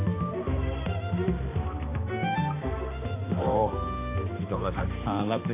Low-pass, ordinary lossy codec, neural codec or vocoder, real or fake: 3.6 kHz; AAC, 24 kbps; codec, 16 kHz, 2 kbps, X-Codec, HuBERT features, trained on balanced general audio; fake